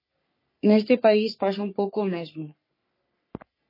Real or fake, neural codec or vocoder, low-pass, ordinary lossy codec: fake; codec, 44.1 kHz, 3.4 kbps, Pupu-Codec; 5.4 kHz; MP3, 24 kbps